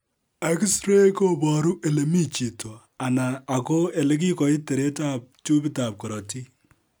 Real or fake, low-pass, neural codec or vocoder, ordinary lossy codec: real; none; none; none